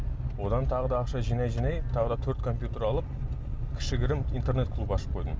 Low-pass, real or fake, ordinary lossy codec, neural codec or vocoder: none; real; none; none